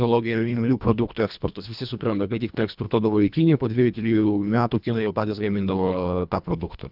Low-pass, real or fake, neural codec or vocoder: 5.4 kHz; fake; codec, 24 kHz, 1.5 kbps, HILCodec